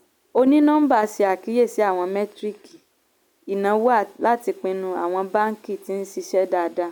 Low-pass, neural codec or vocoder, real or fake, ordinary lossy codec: 19.8 kHz; none; real; none